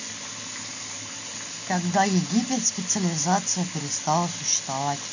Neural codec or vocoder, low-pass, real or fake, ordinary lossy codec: none; 7.2 kHz; real; none